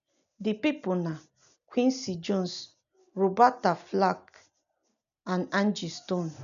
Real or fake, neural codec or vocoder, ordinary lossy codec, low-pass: real; none; none; 7.2 kHz